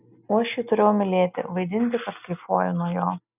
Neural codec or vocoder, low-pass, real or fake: none; 3.6 kHz; real